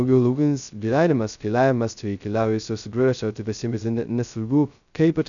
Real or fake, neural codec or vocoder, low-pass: fake; codec, 16 kHz, 0.2 kbps, FocalCodec; 7.2 kHz